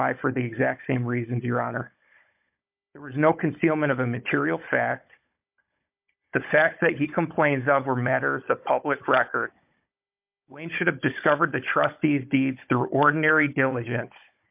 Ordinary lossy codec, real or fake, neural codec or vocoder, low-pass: MP3, 32 kbps; fake; codec, 16 kHz, 16 kbps, FunCodec, trained on Chinese and English, 50 frames a second; 3.6 kHz